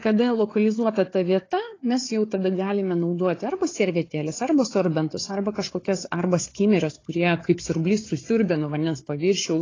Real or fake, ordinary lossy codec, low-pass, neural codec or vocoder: fake; AAC, 32 kbps; 7.2 kHz; codec, 16 kHz, 4 kbps, FunCodec, trained on Chinese and English, 50 frames a second